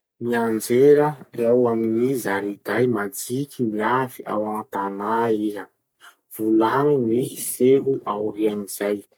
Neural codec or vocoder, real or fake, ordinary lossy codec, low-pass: codec, 44.1 kHz, 3.4 kbps, Pupu-Codec; fake; none; none